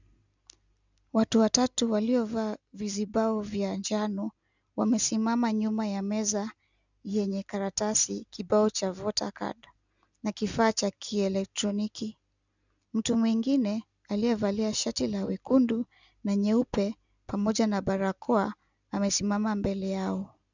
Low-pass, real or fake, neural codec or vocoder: 7.2 kHz; real; none